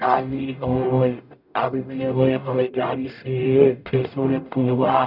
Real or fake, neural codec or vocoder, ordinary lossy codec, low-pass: fake; codec, 44.1 kHz, 0.9 kbps, DAC; none; 5.4 kHz